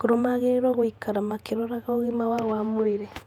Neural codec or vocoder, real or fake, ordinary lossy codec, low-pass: vocoder, 48 kHz, 128 mel bands, Vocos; fake; none; 19.8 kHz